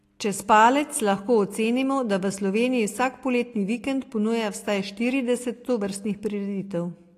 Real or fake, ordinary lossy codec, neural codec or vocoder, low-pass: real; AAC, 48 kbps; none; 14.4 kHz